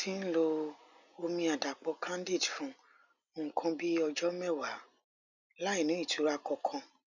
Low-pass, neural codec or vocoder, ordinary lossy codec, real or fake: 7.2 kHz; none; none; real